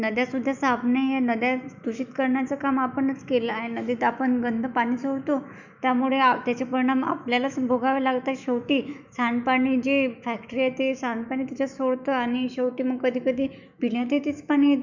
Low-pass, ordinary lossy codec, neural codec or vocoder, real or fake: 7.2 kHz; none; autoencoder, 48 kHz, 128 numbers a frame, DAC-VAE, trained on Japanese speech; fake